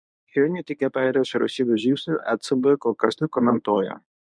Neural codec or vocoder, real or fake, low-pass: codec, 24 kHz, 0.9 kbps, WavTokenizer, medium speech release version 1; fake; 9.9 kHz